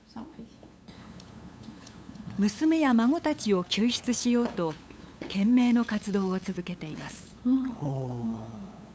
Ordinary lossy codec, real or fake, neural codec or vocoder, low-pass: none; fake; codec, 16 kHz, 8 kbps, FunCodec, trained on LibriTTS, 25 frames a second; none